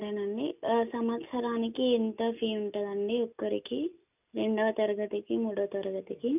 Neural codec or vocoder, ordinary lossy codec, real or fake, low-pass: none; none; real; 3.6 kHz